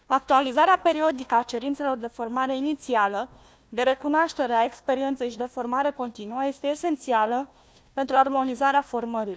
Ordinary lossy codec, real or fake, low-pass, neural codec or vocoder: none; fake; none; codec, 16 kHz, 1 kbps, FunCodec, trained on Chinese and English, 50 frames a second